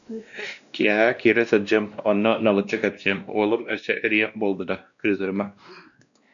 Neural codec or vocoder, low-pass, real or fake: codec, 16 kHz, 1 kbps, X-Codec, WavLM features, trained on Multilingual LibriSpeech; 7.2 kHz; fake